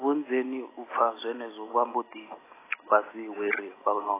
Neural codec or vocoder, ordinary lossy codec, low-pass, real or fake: none; AAC, 16 kbps; 3.6 kHz; real